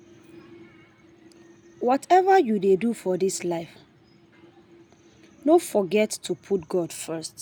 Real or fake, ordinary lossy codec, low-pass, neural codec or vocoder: real; none; none; none